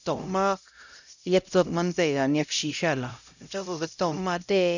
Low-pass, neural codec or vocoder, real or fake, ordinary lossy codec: 7.2 kHz; codec, 16 kHz, 0.5 kbps, X-Codec, HuBERT features, trained on LibriSpeech; fake; none